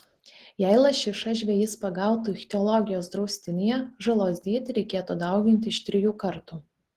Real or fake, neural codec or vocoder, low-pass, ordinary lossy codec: real; none; 14.4 kHz; Opus, 16 kbps